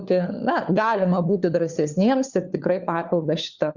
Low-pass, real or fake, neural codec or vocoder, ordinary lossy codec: 7.2 kHz; fake; codec, 16 kHz, 4 kbps, FunCodec, trained on LibriTTS, 50 frames a second; Opus, 64 kbps